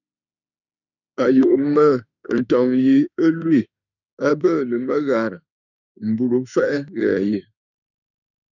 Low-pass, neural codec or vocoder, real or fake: 7.2 kHz; autoencoder, 48 kHz, 32 numbers a frame, DAC-VAE, trained on Japanese speech; fake